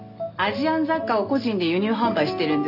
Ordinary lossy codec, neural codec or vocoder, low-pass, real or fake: none; none; 5.4 kHz; real